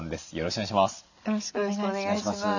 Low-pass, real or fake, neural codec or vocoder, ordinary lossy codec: 7.2 kHz; real; none; none